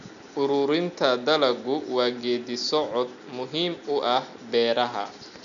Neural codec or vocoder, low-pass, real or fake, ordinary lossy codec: none; 7.2 kHz; real; none